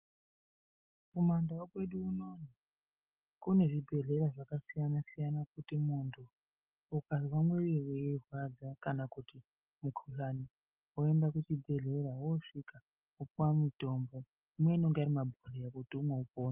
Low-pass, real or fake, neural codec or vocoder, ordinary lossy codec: 3.6 kHz; real; none; Opus, 24 kbps